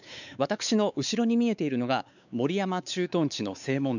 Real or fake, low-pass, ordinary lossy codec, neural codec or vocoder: fake; 7.2 kHz; none; codec, 16 kHz, 4 kbps, X-Codec, WavLM features, trained on Multilingual LibriSpeech